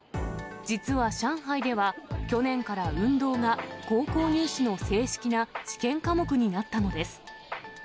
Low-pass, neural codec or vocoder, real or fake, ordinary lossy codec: none; none; real; none